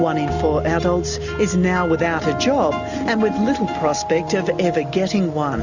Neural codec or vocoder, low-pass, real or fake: none; 7.2 kHz; real